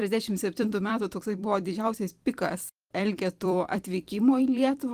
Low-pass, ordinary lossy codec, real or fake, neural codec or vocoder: 14.4 kHz; Opus, 24 kbps; fake; vocoder, 44.1 kHz, 128 mel bands every 256 samples, BigVGAN v2